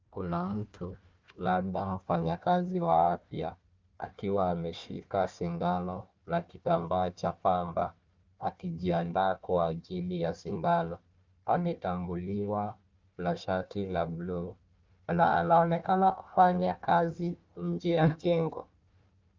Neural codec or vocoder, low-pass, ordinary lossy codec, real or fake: codec, 16 kHz, 1 kbps, FunCodec, trained on Chinese and English, 50 frames a second; 7.2 kHz; Opus, 24 kbps; fake